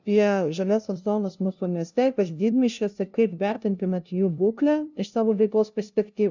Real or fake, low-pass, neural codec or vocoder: fake; 7.2 kHz; codec, 16 kHz, 0.5 kbps, FunCodec, trained on LibriTTS, 25 frames a second